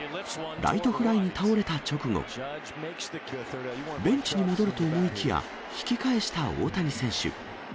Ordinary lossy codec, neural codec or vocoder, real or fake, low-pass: none; none; real; none